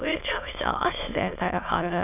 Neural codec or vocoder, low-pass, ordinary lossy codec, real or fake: autoencoder, 22.05 kHz, a latent of 192 numbers a frame, VITS, trained on many speakers; 3.6 kHz; none; fake